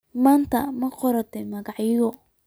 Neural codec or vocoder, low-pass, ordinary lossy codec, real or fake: none; none; none; real